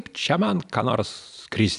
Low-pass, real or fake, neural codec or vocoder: 10.8 kHz; real; none